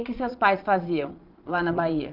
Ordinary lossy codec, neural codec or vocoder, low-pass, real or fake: Opus, 32 kbps; vocoder, 22.05 kHz, 80 mel bands, Vocos; 5.4 kHz; fake